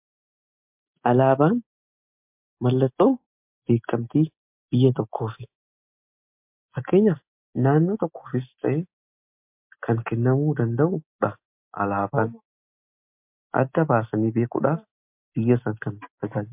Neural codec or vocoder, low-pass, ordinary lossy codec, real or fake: none; 3.6 kHz; MP3, 32 kbps; real